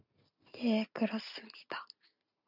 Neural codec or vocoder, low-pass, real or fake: none; 5.4 kHz; real